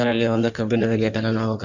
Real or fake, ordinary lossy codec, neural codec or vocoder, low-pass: fake; none; codec, 16 kHz in and 24 kHz out, 1.1 kbps, FireRedTTS-2 codec; 7.2 kHz